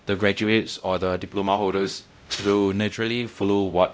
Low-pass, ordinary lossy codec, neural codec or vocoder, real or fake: none; none; codec, 16 kHz, 0.5 kbps, X-Codec, WavLM features, trained on Multilingual LibriSpeech; fake